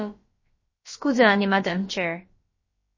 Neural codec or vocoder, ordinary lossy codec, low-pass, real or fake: codec, 16 kHz, about 1 kbps, DyCAST, with the encoder's durations; MP3, 32 kbps; 7.2 kHz; fake